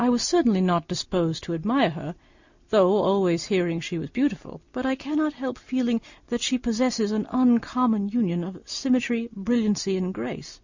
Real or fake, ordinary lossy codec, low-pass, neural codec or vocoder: real; Opus, 64 kbps; 7.2 kHz; none